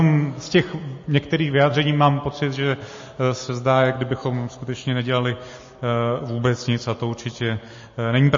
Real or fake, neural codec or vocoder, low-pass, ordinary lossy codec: real; none; 7.2 kHz; MP3, 32 kbps